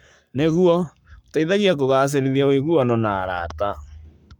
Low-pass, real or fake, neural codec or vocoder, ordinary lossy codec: 19.8 kHz; fake; codec, 44.1 kHz, 7.8 kbps, Pupu-Codec; none